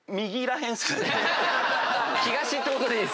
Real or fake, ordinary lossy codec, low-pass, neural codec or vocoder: real; none; none; none